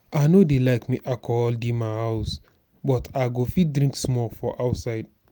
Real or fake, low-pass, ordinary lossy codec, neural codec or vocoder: real; 19.8 kHz; none; none